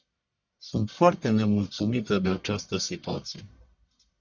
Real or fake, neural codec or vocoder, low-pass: fake; codec, 44.1 kHz, 1.7 kbps, Pupu-Codec; 7.2 kHz